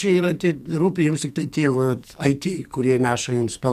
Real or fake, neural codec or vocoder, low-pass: fake; codec, 44.1 kHz, 2.6 kbps, SNAC; 14.4 kHz